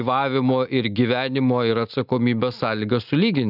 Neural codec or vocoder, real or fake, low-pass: none; real; 5.4 kHz